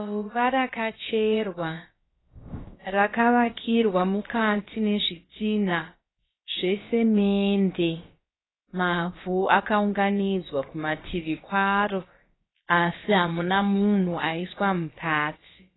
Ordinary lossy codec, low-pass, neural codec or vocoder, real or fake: AAC, 16 kbps; 7.2 kHz; codec, 16 kHz, about 1 kbps, DyCAST, with the encoder's durations; fake